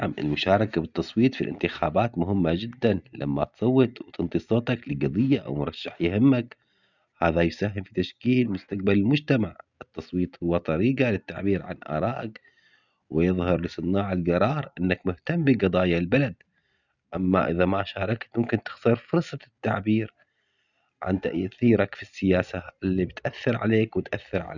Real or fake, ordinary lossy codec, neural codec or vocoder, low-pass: real; none; none; 7.2 kHz